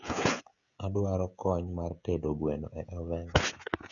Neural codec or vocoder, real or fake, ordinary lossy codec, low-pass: codec, 16 kHz, 16 kbps, FreqCodec, smaller model; fake; none; 7.2 kHz